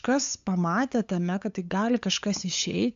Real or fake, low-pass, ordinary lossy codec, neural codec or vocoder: fake; 7.2 kHz; AAC, 64 kbps; codec, 16 kHz, 8 kbps, FunCodec, trained on LibriTTS, 25 frames a second